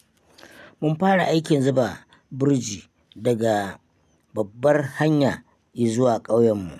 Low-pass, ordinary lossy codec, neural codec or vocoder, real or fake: 14.4 kHz; none; none; real